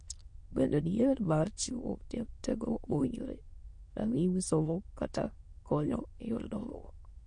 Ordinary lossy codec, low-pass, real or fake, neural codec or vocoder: MP3, 48 kbps; 9.9 kHz; fake; autoencoder, 22.05 kHz, a latent of 192 numbers a frame, VITS, trained on many speakers